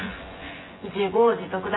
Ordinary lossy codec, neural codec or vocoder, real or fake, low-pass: AAC, 16 kbps; vocoder, 24 kHz, 100 mel bands, Vocos; fake; 7.2 kHz